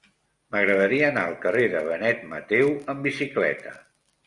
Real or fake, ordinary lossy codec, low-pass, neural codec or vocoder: real; Opus, 64 kbps; 10.8 kHz; none